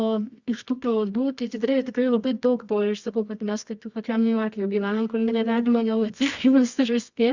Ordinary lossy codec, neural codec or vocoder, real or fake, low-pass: Opus, 64 kbps; codec, 24 kHz, 0.9 kbps, WavTokenizer, medium music audio release; fake; 7.2 kHz